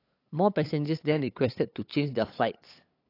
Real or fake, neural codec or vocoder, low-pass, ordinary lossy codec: fake; codec, 16 kHz, 8 kbps, FunCodec, trained on LibriTTS, 25 frames a second; 5.4 kHz; AAC, 32 kbps